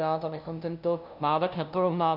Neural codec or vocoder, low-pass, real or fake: codec, 16 kHz, 0.5 kbps, FunCodec, trained on LibriTTS, 25 frames a second; 5.4 kHz; fake